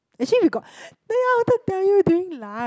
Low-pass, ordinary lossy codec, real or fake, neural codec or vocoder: none; none; real; none